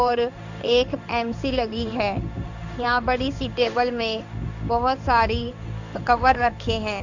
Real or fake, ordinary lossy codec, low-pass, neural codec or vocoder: fake; none; 7.2 kHz; codec, 16 kHz in and 24 kHz out, 1 kbps, XY-Tokenizer